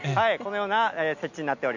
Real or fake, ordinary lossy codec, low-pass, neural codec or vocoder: real; none; 7.2 kHz; none